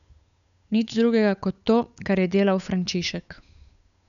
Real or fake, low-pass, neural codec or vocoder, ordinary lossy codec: fake; 7.2 kHz; codec, 16 kHz, 8 kbps, FunCodec, trained on Chinese and English, 25 frames a second; none